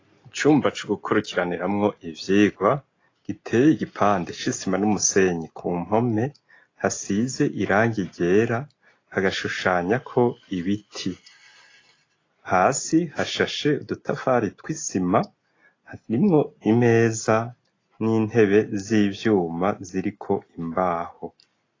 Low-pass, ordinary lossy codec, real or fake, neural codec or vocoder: 7.2 kHz; AAC, 32 kbps; real; none